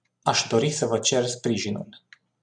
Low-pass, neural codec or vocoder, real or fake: 9.9 kHz; none; real